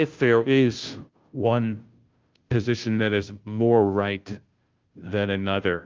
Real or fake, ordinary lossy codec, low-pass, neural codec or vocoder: fake; Opus, 24 kbps; 7.2 kHz; codec, 16 kHz, 0.5 kbps, FunCodec, trained on Chinese and English, 25 frames a second